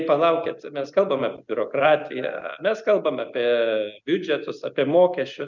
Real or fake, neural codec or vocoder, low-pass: real; none; 7.2 kHz